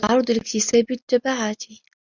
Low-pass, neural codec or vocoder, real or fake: 7.2 kHz; none; real